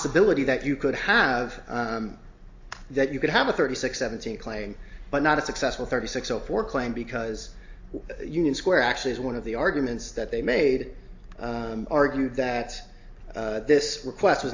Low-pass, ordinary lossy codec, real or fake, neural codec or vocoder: 7.2 kHz; AAC, 48 kbps; real; none